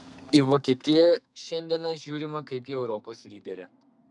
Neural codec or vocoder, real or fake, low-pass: codec, 32 kHz, 1.9 kbps, SNAC; fake; 10.8 kHz